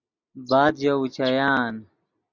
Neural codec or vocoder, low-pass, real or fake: none; 7.2 kHz; real